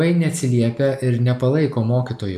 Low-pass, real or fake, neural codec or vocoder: 14.4 kHz; real; none